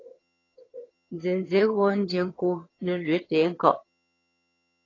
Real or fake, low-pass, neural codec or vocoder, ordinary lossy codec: fake; 7.2 kHz; vocoder, 22.05 kHz, 80 mel bands, HiFi-GAN; AAC, 32 kbps